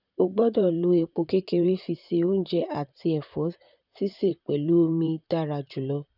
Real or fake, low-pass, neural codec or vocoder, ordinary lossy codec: fake; 5.4 kHz; vocoder, 44.1 kHz, 128 mel bands, Pupu-Vocoder; none